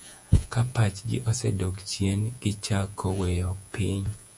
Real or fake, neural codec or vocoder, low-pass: fake; vocoder, 48 kHz, 128 mel bands, Vocos; 10.8 kHz